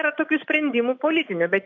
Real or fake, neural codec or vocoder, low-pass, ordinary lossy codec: real; none; 7.2 kHz; AAC, 48 kbps